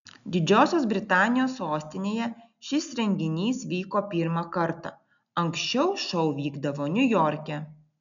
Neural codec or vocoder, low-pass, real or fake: none; 7.2 kHz; real